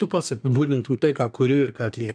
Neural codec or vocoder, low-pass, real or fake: codec, 24 kHz, 1 kbps, SNAC; 9.9 kHz; fake